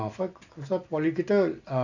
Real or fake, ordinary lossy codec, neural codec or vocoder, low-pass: real; none; none; 7.2 kHz